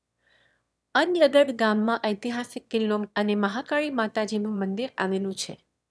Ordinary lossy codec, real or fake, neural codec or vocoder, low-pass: none; fake; autoencoder, 22.05 kHz, a latent of 192 numbers a frame, VITS, trained on one speaker; none